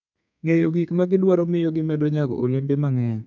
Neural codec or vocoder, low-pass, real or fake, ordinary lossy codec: codec, 32 kHz, 1.9 kbps, SNAC; 7.2 kHz; fake; none